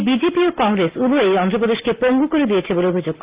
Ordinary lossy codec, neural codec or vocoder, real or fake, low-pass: Opus, 32 kbps; none; real; 3.6 kHz